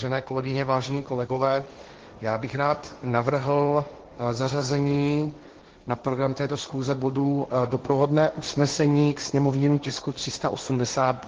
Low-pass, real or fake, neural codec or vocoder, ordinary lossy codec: 7.2 kHz; fake; codec, 16 kHz, 1.1 kbps, Voila-Tokenizer; Opus, 16 kbps